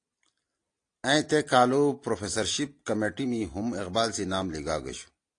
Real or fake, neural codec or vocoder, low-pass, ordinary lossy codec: real; none; 9.9 kHz; AAC, 48 kbps